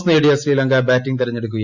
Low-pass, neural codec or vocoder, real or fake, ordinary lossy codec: 7.2 kHz; none; real; none